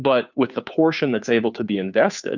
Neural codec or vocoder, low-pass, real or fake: codec, 16 kHz, 2 kbps, FunCodec, trained on Chinese and English, 25 frames a second; 7.2 kHz; fake